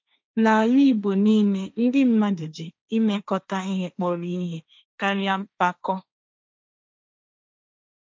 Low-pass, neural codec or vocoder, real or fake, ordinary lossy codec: none; codec, 16 kHz, 1.1 kbps, Voila-Tokenizer; fake; none